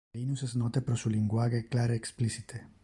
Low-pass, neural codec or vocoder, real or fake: 10.8 kHz; none; real